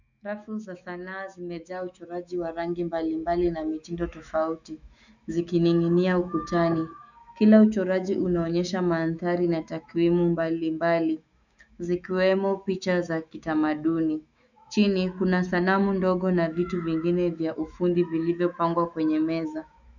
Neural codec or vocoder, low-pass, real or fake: autoencoder, 48 kHz, 128 numbers a frame, DAC-VAE, trained on Japanese speech; 7.2 kHz; fake